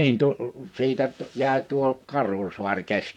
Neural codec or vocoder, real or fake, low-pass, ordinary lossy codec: vocoder, 44.1 kHz, 128 mel bands, Pupu-Vocoder; fake; 19.8 kHz; none